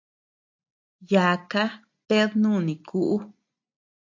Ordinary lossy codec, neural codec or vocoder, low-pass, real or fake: AAC, 48 kbps; none; 7.2 kHz; real